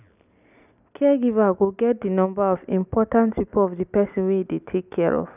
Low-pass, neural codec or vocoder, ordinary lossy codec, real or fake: 3.6 kHz; none; none; real